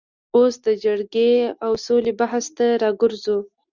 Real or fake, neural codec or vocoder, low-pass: real; none; 7.2 kHz